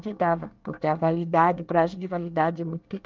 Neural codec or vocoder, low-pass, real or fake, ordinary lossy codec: codec, 24 kHz, 1 kbps, SNAC; 7.2 kHz; fake; Opus, 24 kbps